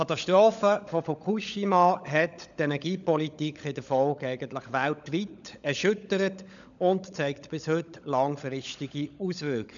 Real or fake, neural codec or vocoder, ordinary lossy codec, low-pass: fake; codec, 16 kHz, 16 kbps, FunCodec, trained on LibriTTS, 50 frames a second; none; 7.2 kHz